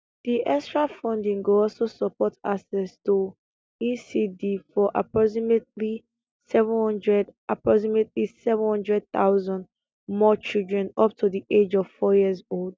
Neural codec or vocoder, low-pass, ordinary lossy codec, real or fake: none; none; none; real